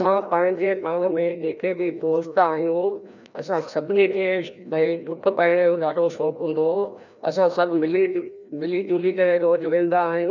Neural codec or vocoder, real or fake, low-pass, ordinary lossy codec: codec, 16 kHz, 1 kbps, FreqCodec, larger model; fake; 7.2 kHz; none